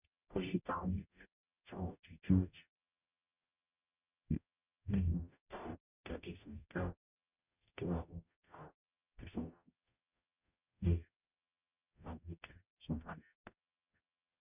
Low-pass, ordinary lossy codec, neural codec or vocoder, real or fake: 3.6 kHz; none; codec, 44.1 kHz, 0.9 kbps, DAC; fake